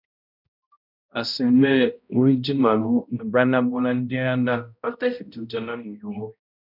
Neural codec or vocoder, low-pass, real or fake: codec, 16 kHz, 0.5 kbps, X-Codec, HuBERT features, trained on balanced general audio; 5.4 kHz; fake